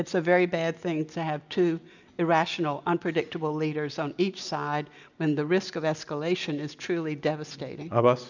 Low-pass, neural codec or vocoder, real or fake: 7.2 kHz; none; real